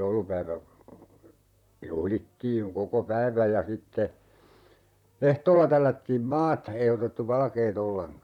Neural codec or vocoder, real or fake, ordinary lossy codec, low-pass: vocoder, 44.1 kHz, 128 mel bands, Pupu-Vocoder; fake; none; 19.8 kHz